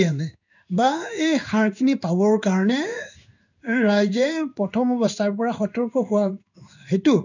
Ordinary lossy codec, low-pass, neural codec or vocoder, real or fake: none; 7.2 kHz; codec, 16 kHz in and 24 kHz out, 1 kbps, XY-Tokenizer; fake